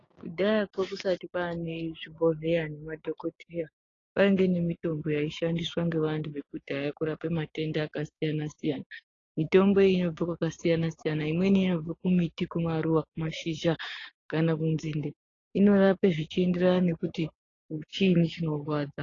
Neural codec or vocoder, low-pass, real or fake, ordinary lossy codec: codec, 16 kHz, 6 kbps, DAC; 7.2 kHz; fake; AAC, 32 kbps